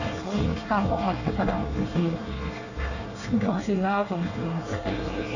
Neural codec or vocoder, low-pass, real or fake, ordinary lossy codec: codec, 24 kHz, 1 kbps, SNAC; 7.2 kHz; fake; none